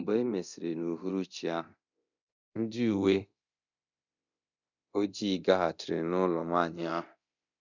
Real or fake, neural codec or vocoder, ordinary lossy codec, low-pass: fake; codec, 24 kHz, 0.9 kbps, DualCodec; none; 7.2 kHz